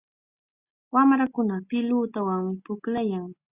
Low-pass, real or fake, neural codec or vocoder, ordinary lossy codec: 3.6 kHz; real; none; Opus, 64 kbps